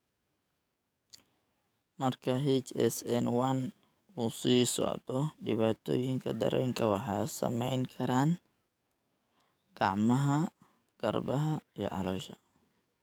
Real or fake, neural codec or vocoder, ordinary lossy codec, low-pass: fake; codec, 44.1 kHz, 7.8 kbps, DAC; none; none